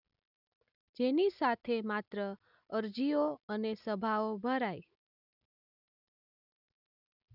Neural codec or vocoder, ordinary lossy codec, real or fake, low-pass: none; none; real; 5.4 kHz